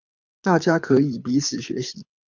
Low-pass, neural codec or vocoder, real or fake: 7.2 kHz; codec, 24 kHz, 6 kbps, HILCodec; fake